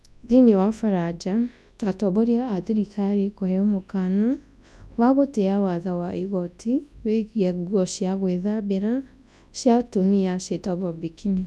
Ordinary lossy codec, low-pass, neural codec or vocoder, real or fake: none; none; codec, 24 kHz, 0.9 kbps, WavTokenizer, large speech release; fake